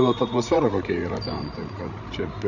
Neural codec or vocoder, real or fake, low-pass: codec, 16 kHz, 16 kbps, FreqCodec, larger model; fake; 7.2 kHz